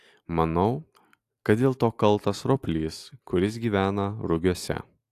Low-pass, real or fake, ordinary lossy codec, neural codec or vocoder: 14.4 kHz; real; AAC, 64 kbps; none